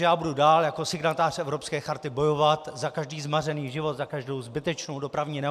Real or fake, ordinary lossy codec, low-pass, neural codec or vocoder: real; MP3, 96 kbps; 14.4 kHz; none